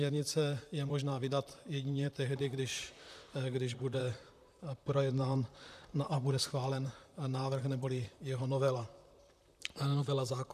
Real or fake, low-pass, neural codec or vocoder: fake; 14.4 kHz; vocoder, 44.1 kHz, 128 mel bands, Pupu-Vocoder